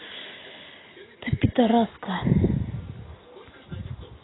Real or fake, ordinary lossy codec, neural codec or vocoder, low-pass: real; AAC, 16 kbps; none; 7.2 kHz